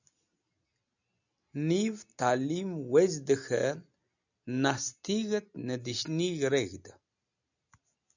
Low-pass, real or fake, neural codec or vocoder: 7.2 kHz; real; none